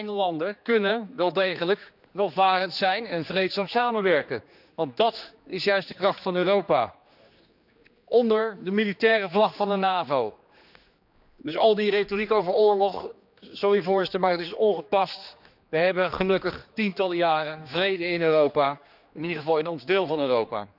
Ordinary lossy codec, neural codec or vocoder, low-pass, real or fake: none; codec, 16 kHz, 2 kbps, X-Codec, HuBERT features, trained on general audio; 5.4 kHz; fake